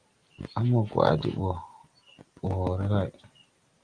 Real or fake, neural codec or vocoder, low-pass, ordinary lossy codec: real; none; 9.9 kHz; Opus, 24 kbps